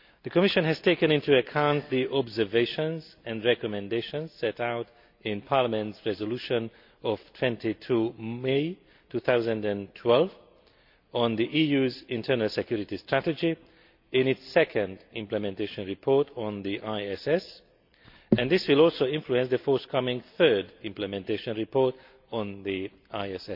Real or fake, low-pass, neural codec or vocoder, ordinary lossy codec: real; 5.4 kHz; none; MP3, 48 kbps